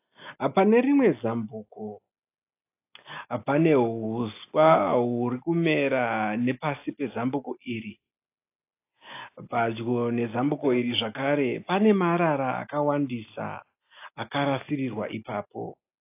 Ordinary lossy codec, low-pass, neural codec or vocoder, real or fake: AAC, 24 kbps; 3.6 kHz; none; real